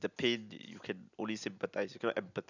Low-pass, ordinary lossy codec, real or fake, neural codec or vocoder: 7.2 kHz; none; real; none